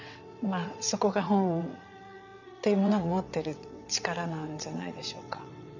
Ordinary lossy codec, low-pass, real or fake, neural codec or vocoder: none; 7.2 kHz; fake; vocoder, 22.05 kHz, 80 mel bands, WaveNeXt